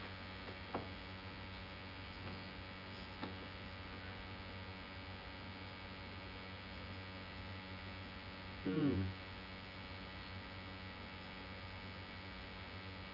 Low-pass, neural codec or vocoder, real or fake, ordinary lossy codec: 5.4 kHz; vocoder, 24 kHz, 100 mel bands, Vocos; fake; none